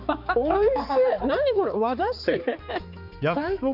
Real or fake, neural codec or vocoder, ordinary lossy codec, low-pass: fake; codec, 16 kHz, 4 kbps, X-Codec, HuBERT features, trained on balanced general audio; none; 5.4 kHz